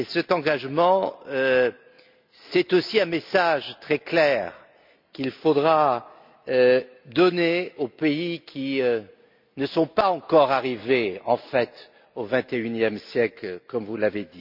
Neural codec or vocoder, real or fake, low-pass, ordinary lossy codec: none; real; 5.4 kHz; MP3, 48 kbps